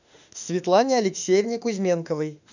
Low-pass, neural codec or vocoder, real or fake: 7.2 kHz; autoencoder, 48 kHz, 32 numbers a frame, DAC-VAE, trained on Japanese speech; fake